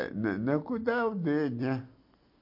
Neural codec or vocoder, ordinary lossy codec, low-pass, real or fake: none; MP3, 32 kbps; 5.4 kHz; real